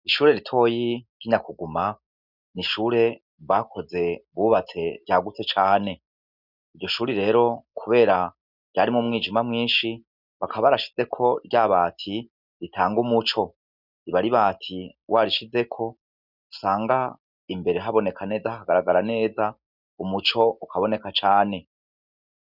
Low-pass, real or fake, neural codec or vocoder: 5.4 kHz; real; none